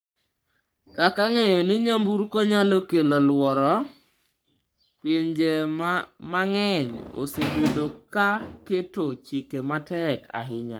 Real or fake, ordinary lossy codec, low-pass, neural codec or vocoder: fake; none; none; codec, 44.1 kHz, 3.4 kbps, Pupu-Codec